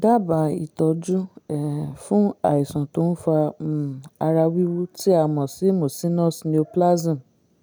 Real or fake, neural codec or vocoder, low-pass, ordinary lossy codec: real; none; none; none